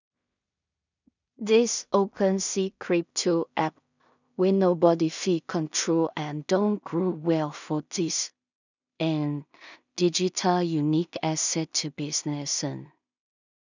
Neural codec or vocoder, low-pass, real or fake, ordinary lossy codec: codec, 16 kHz in and 24 kHz out, 0.4 kbps, LongCat-Audio-Codec, two codebook decoder; 7.2 kHz; fake; none